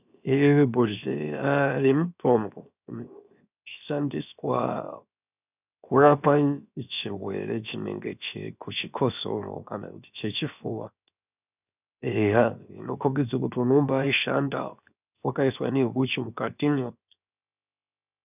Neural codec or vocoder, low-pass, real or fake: codec, 16 kHz, 0.7 kbps, FocalCodec; 3.6 kHz; fake